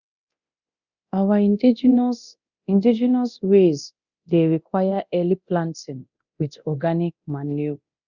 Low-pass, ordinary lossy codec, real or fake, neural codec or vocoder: 7.2 kHz; Opus, 64 kbps; fake; codec, 24 kHz, 0.9 kbps, DualCodec